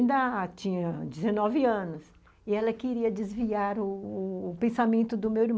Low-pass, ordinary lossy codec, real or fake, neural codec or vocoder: none; none; real; none